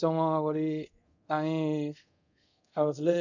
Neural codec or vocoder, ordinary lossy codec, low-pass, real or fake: codec, 24 kHz, 0.5 kbps, DualCodec; none; 7.2 kHz; fake